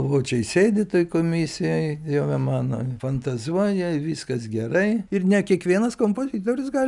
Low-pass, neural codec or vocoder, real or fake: 10.8 kHz; none; real